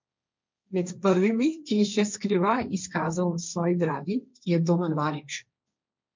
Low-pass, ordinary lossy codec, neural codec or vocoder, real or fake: 7.2 kHz; MP3, 64 kbps; codec, 16 kHz, 1.1 kbps, Voila-Tokenizer; fake